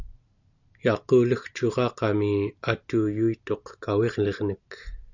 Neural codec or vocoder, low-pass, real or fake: none; 7.2 kHz; real